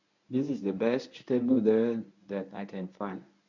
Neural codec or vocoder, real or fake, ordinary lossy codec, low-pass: codec, 24 kHz, 0.9 kbps, WavTokenizer, medium speech release version 1; fake; none; 7.2 kHz